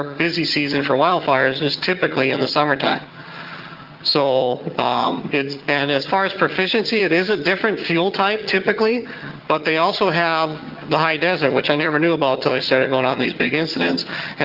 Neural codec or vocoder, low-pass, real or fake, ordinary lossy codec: vocoder, 22.05 kHz, 80 mel bands, HiFi-GAN; 5.4 kHz; fake; Opus, 32 kbps